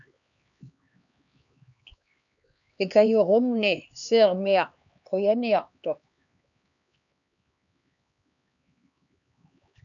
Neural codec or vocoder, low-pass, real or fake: codec, 16 kHz, 2 kbps, X-Codec, HuBERT features, trained on LibriSpeech; 7.2 kHz; fake